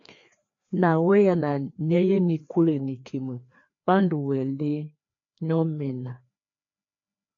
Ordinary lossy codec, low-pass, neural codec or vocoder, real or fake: AAC, 48 kbps; 7.2 kHz; codec, 16 kHz, 2 kbps, FreqCodec, larger model; fake